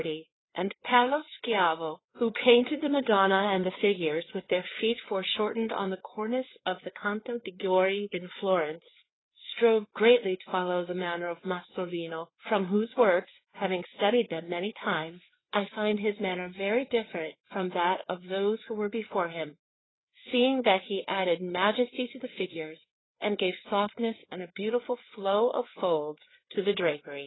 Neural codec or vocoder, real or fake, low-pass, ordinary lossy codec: codec, 16 kHz, 4 kbps, FreqCodec, larger model; fake; 7.2 kHz; AAC, 16 kbps